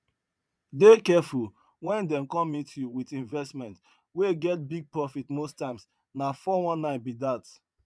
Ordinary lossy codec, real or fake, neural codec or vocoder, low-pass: none; fake; vocoder, 22.05 kHz, 80 mel bands, Vocos; none